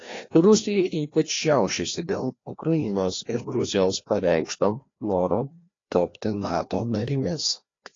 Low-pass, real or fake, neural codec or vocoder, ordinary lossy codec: 7.2 kHz; fake; codec, 16 kHz, 1 kbps, FreqCodec, larger model; AAC, 32 kbps